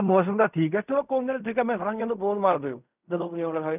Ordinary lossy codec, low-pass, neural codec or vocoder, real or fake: none; 3.6 kHz; codec, 16 kHz in and 24 kHz out, 0.4 kbps, LongCat-Audio-Codec, fine tuned four codebook decoder; fake